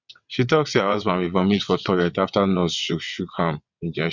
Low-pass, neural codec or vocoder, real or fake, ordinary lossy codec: 7.2 kHz; vocoder, 22.05 kHz, 80 mel bands, WaveNeXt; fake; none